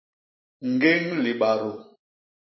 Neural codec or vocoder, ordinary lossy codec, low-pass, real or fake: vocoder, 44.1 kHz, 128 mel bands every 256 samples, BigVGAN v2; MP3, 24 kbps; 7.2 kHz; fake